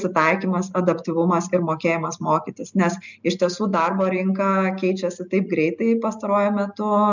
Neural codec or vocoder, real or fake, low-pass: none; real; 7.2 kHz